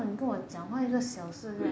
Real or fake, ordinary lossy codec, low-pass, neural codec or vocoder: real; none; none; none